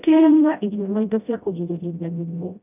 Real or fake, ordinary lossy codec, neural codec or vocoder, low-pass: fake; none; codec, 16 kHz, 0.5 kbps, FreqCodec, smaller model; 3.6 kHz